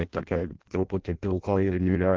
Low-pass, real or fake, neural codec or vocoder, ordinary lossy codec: 7.2 kHz; fake; codec, 16 kHz in and 24 kHz out, 0.6 kbps, FireRedTTS-2 codec; Opus, 16 kbps